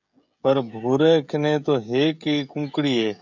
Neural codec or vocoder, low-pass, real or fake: codec, 16 kHz, 16 kbps, FreqCodec, smaller model; 7.2 kHz; fake